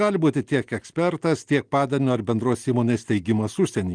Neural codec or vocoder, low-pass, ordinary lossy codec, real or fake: none; 9.9 kHz; Opus, 24 kbps; real